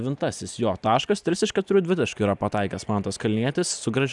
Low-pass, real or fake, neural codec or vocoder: 10.8 kHz; real; none